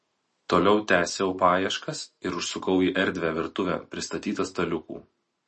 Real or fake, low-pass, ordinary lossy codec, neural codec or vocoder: real; 10.8 kHz; MP3, 32 kbps; none